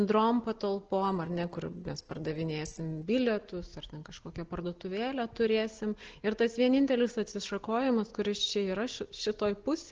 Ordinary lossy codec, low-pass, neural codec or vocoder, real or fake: Opus, 16 kbps; 7.2 kHz; none; real